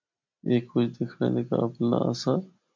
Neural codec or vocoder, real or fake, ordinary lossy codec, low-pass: none; real; MP3, 64 kbps; 7.2 kHz